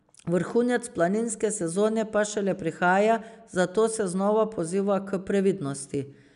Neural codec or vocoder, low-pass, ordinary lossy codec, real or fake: none; 10.8 kHz; none; real